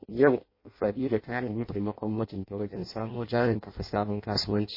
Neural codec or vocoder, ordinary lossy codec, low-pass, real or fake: codec, 16 kHz in and 24 kHz out, 0.6 kbps, FireRedTTS-2 codec; MP3, 24 kbps; 5.4 kHz; fake